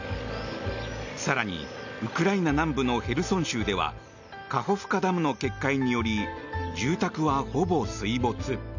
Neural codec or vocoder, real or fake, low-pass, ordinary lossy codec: none; real; 7.2 kHz; none